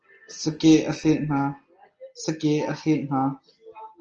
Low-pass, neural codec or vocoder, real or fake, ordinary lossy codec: 7.2 kHz; none; real; Opus, 24 kbps